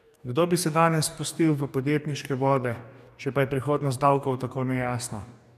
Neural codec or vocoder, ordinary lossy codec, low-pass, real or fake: codec, 44.1 kHz, 2.6 kbps, DAC; none; 14.4 kHz; fake